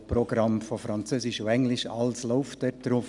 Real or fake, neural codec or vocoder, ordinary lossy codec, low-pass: real; none; none; 10.8 kHz